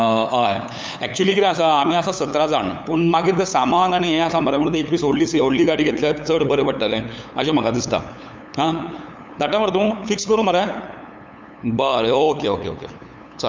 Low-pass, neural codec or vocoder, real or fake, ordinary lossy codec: none; codec, 16 kHz, 8 kbps, FunCodec, trained on LibriTTS, 25 frames a second; fake; none